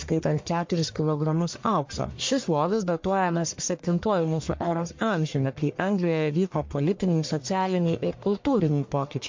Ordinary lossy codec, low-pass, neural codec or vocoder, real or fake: MP3, 48 kbps; 7.2 kHz; codec, 44.1 kHz, 1.7 kbps, Pupu-Codec; fake